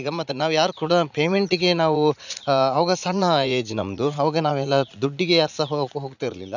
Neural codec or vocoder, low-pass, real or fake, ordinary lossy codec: vocoder, 44.1 kHz, 80 mel bands, Vocos; 7.2 kHz; fake; none